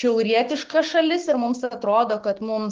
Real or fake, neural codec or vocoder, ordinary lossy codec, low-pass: fake; codec, 16 kHz, 6 kbps, DAC; Opus, 16 kbps; 7.2 kHz